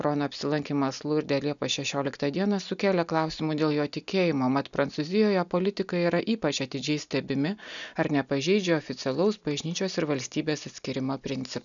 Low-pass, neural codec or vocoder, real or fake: 7.2 kHz; none; real